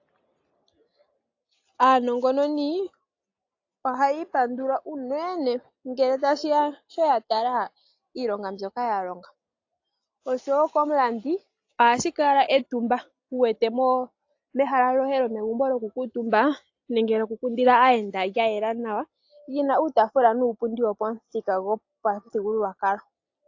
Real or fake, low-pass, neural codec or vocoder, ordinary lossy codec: real; 7.2 kHz; none; AAC, 48 kbps